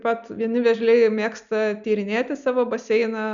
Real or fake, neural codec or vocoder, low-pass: real; none; 7.2 kHz